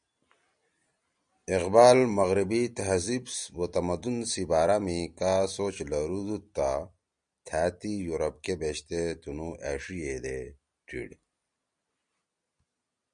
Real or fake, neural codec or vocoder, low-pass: real; none; 9.9 kHz